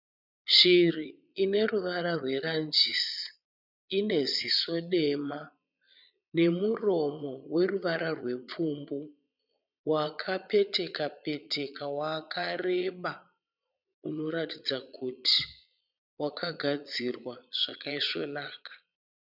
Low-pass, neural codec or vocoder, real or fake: 5.4 kHz; vocoder, 22.05 kHz, 80 mel bands, Vocos; fake